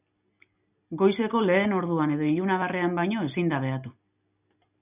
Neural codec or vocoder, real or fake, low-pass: none; real; 3.6 kHz